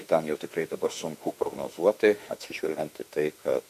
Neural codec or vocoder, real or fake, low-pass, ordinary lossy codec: autoencoder, 48 kHz, 32 numbers a frame, DAC-VAE, trained on Japanese speech; fake; 14.4 kHz; MP3, 64 kbps